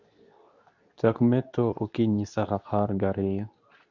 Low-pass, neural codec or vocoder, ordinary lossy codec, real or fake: 7.2 kHz; codec, 24 kHz, 0.9 kbps, WavTokenizer, medium speech release version 2; none; fake